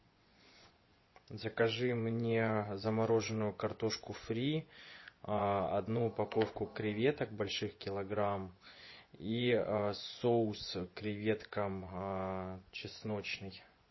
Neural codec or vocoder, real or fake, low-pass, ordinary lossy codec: vocoder, 24 kHz, 100 mel bands, Vocos; fake; 7.2 kHz; MP3, 24 kbps